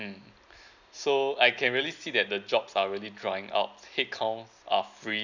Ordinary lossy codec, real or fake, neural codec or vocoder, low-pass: none; real; none; 7.2 kHz